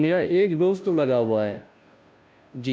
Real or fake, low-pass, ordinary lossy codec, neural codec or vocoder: fake; none; none; codec, 16 kHz, 0.5 kbps, FunCodec, trained on Chinese and English, 25 frames a second